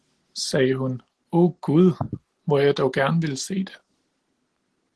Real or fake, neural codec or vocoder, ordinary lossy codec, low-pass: real; none; Opus, 16 kbps; 10.8 kHz